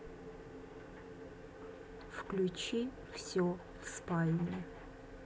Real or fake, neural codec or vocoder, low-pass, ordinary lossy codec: real; none; none; none